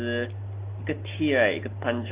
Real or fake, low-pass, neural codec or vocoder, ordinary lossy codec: real; 3.6 kHz; none; Opus, 24 kbps